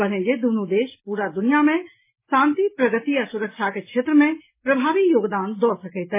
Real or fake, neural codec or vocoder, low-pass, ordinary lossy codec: real; none; 3.6 kHz; MP3, 16 kbps